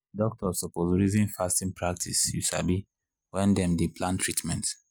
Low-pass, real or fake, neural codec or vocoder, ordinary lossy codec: none; real; none; none